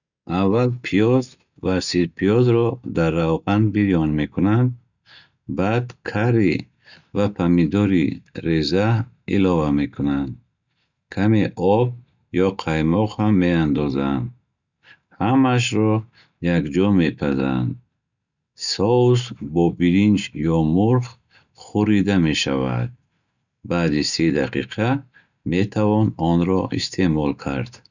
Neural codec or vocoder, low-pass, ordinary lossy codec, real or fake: none; 7.2 kHz; none; real